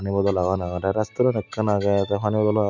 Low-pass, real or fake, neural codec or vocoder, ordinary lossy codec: 7.2 kHz; real; none; none